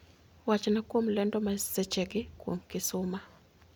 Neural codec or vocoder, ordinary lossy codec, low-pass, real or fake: none; none; none; real